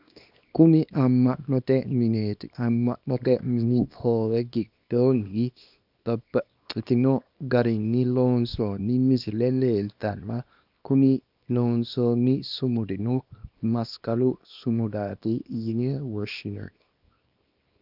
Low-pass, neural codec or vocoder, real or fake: 5.4 kHz; codec, 24 kHz, 0.9 kbps, WavTokenizer, small release; fake